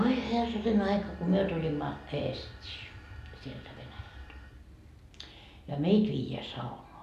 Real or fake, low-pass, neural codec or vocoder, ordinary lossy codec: real; 14.4 kHz; none; none